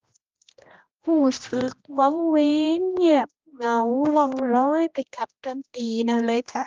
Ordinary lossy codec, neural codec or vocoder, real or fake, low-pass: Opus, 24 kbps; codec, 16 kHz, 1 kbps, X-Codec, HuBERT features, trained on general audio; fake; 7.2 kHz